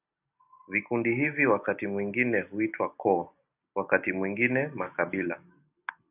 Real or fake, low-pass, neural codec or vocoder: real; 3.6 kHz; none